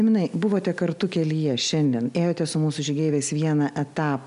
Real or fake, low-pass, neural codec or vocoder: real; 10.8 kHz; none